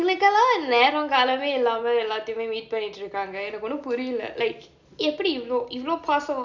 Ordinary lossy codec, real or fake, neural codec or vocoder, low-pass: none; real; none; 7.2 kHz